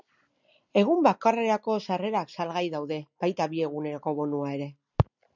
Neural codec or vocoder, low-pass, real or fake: none; 7.2 kHz; real